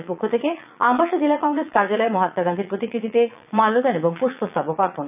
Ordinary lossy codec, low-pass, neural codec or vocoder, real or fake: none; 3.6 kHz; vocoder, 22.05 kHz, 80 mel bands, Vocos; fake